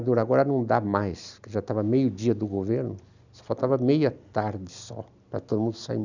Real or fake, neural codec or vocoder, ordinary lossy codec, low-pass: real; none; none; 7.2 kHz